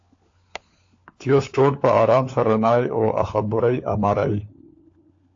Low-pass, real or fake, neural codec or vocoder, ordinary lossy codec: 7.2 kHz; fake; codec, 16 kHz, 4 kbps, FunCodec, trained on LibriTTS, 50 frames a second; AAC, 48 kbps